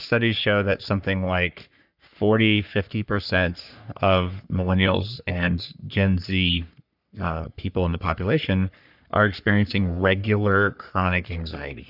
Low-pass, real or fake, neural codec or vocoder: 5.4 kHz; fake; codec, 44.1 kHz, 3.4 kbps, Pupu-Codec